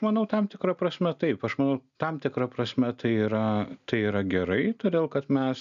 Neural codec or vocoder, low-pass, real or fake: none; 7.2 kHz; real